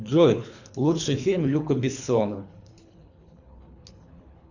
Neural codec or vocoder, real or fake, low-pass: codec, 24 kHz, 3 kbps, HILCodec; fake; 7.2 kHz